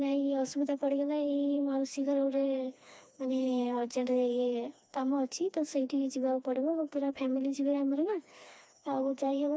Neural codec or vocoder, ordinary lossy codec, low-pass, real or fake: codec, 16 kHz, 2 kbps, FreqCodec, smaller model; none; none; fake